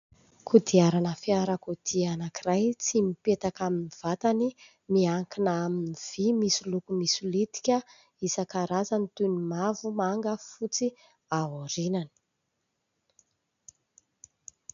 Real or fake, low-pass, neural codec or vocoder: real; 7.2 kHz; none